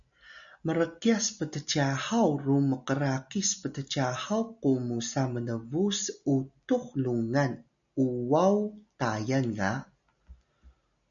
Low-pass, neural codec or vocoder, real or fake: 7.2 kHz; none; real